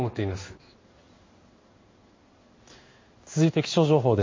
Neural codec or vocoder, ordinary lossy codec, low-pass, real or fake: codec, 16 kHz in and 24 kHz out, 1 kbps, XY-Tokenizer; MP3, 32 kbps; 7.2 kHz; fake